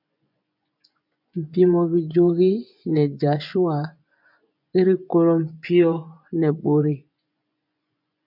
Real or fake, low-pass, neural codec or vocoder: fake; 5.4 kHz; vocoder, 24 kHz, 100 mel bands, Vocos